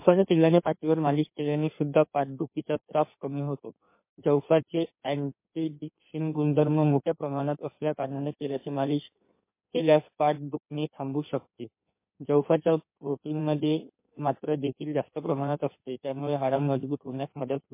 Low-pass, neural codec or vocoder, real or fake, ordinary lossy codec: 3.6 kHz; codec, 16 kHz in and 24 kHz out, 1.1 kbps, FireRedTTS-2 codec; fake; MP3, 24 kbps